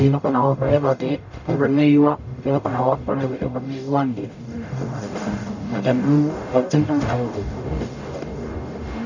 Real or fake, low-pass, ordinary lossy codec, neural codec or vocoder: fake; 7.2 kHz; none; codec, 44.1 kHz, 0.9 kbps, DAC